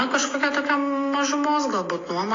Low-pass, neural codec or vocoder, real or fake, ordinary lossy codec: 7.2 kHz; none; real; AAC, 32 kbps